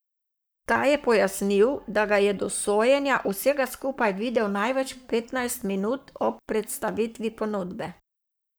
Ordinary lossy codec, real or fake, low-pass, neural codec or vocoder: none; fake; none; codec, 44.1 kHz, 7.8 kbps, Pupu-Codec